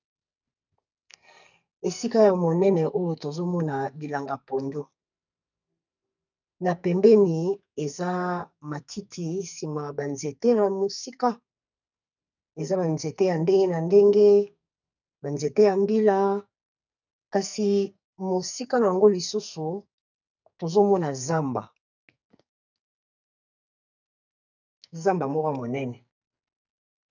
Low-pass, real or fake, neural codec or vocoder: 7.2 kHz; fake; codec, 44.1 kHz, 2.6 kbps, SNAC